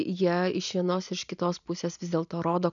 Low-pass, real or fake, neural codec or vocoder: 7.2 kHz; real; none